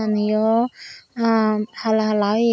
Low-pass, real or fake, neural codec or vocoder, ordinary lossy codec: none; real; none; none